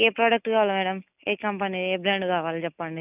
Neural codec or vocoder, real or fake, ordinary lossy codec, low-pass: none; real; none; 3.6 kHz